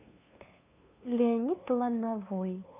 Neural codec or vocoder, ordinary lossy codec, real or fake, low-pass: codec, 16 kHz, 2 kbps, FunCodec, trained on LibriTTS, 25 frames a second; none; fake; 3.6 kHz